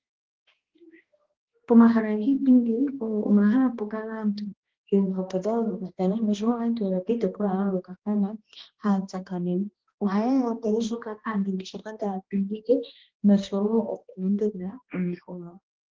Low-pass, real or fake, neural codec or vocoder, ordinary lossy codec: 7.2 kHz; fake; codec, 16 kHz, 1 kbps, X-Codec, HuBERT features, trained on balanced general audio; Opus, 16 kbps